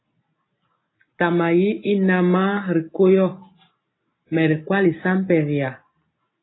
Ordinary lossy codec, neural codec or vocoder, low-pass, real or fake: AAC, 16 kbps; none; 7.2 kHz; real